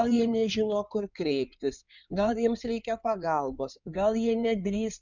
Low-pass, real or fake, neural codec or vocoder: 7.2 kHz; fake; codec, 16 kHz in and 24 kHz out, 2.2 kbps, FireRedTTS-2 codec